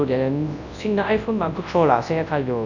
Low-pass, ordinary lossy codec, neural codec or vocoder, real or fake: 7.2 kHz; none; codec, 24 kHz, 0.9 kbps, WavTokenizer, large speech release; fake